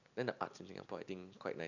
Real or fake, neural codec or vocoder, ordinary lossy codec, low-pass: real; none; none; 7.2 kHz